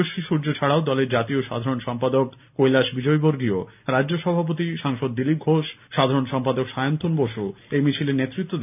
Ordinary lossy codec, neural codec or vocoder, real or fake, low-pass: none; none; real; 3.6 kHz